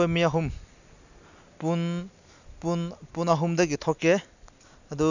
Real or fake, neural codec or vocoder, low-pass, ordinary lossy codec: real; none; 7.2 kHz; none